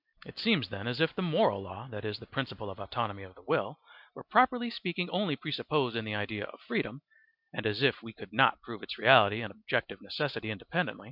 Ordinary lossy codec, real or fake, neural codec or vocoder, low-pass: MP3, 48 kbps; real; none; 5.4 kHz